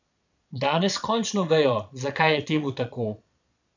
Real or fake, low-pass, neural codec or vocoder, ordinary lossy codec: fake; 7.2 kHz; vocoder, 22.05 kHz, 80 mel bands, Vocos; none